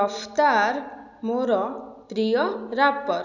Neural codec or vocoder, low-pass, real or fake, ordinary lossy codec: none; 7.2 kHz; real; none